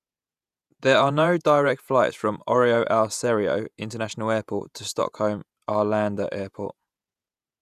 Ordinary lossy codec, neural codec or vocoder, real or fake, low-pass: none; vocoder, 48 kHz, 128 mel bands, Vocos; fake; 14.4 kHz